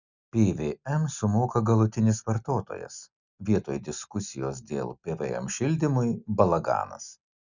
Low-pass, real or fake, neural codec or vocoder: 7.2 kHz; real; none